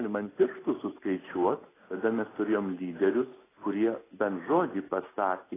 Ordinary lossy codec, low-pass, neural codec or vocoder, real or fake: AAC, 16 kbps; 3.6 kHz; none; real